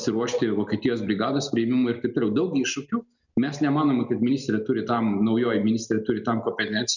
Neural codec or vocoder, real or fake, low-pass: none; real; 7.2 kHz